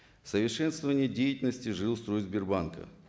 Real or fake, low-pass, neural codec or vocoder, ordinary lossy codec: real; none; none; none